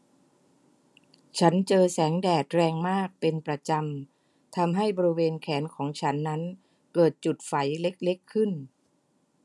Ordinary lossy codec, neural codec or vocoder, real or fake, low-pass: none; none; real; none